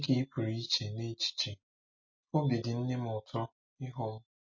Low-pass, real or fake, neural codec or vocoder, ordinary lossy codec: 7.2 kHz; real; none; MP3, 32 kbps